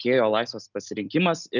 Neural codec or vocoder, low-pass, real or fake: none; 7.2 kHz; real